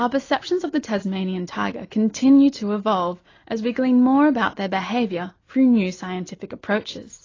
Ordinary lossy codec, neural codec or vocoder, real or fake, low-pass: AAC, 32 kbps; none; real; 7.2 kHz